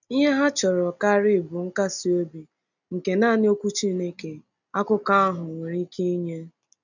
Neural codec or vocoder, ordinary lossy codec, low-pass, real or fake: none; none; 7.2 kHz; real